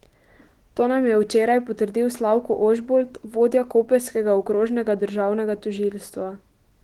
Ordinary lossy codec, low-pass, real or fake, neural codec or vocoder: Opus, 16 kbps; 19.8 kHz; fake; autoencoder, 48 kHz, 128 numbers a frame, DAC-VAE, trained on Japanese speech